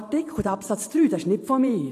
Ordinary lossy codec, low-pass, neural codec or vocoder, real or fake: AAC, 64 kbps; 14.4 kHz; vocoder, 44.1 kHz, 128 mel bands every 512 samples, BigVGAN v2; fake